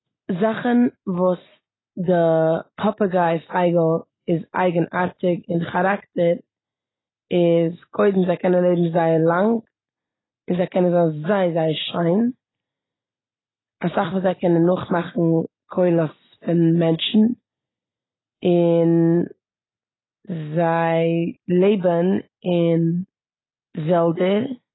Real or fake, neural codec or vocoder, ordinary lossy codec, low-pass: real; none; AAC, 16 kbps; 7.2 kHz